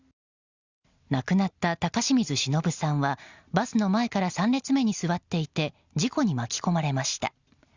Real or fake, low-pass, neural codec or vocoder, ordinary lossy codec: real; 7.2 kHz; none; Opus, 64 kbps